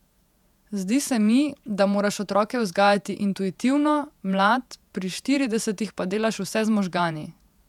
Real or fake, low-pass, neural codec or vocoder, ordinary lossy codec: fake; 19.8 kHz; vocoder, 48 kHz, 128 mel bands, Vocos; none